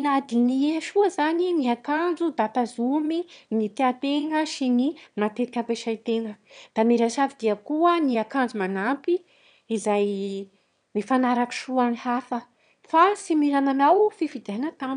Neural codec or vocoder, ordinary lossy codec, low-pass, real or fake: autoencoder, 22.05 kHz, a latent of 192 numbers a frame, VITS, trained on one speaker; none; 9.9 kHz; fake